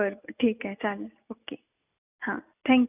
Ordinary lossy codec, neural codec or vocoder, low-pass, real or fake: none; none; 3.6 kHz; real